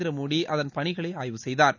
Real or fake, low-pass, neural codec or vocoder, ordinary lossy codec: real; 7.2 kHz; none; none